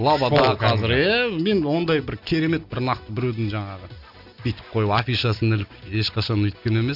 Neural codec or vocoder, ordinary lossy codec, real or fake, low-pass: none; none; real; 5.4 kHz